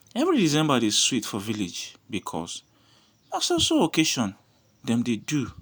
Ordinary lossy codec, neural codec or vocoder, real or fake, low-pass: none; none; real; none